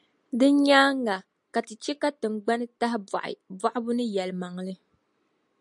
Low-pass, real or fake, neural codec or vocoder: 10.8 kHz; real; none